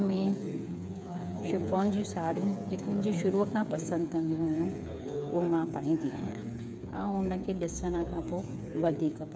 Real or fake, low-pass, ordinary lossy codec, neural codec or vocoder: fake; none; none; codec, 16 kHz, 8 kbps, FreqCodec, smaller model